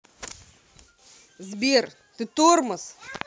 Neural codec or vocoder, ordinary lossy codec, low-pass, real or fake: none; none; none; real